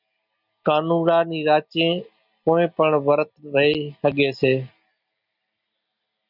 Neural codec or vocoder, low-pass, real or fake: none; 5.4 kHz; real